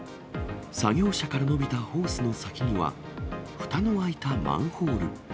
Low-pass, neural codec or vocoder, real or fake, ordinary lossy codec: none; none; real; none